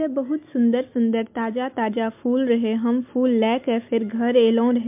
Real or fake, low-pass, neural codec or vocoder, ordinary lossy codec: real; 3.6 kHz; none; MP3, 32 kbps